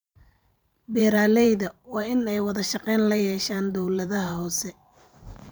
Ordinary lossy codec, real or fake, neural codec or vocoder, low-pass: none; fake; vocoder, 44.1 kHz, 128 mel bands every 512 samples, BigVGAN v2; none